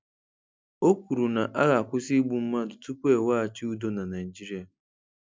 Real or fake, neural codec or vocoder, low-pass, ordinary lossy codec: real; none; none; none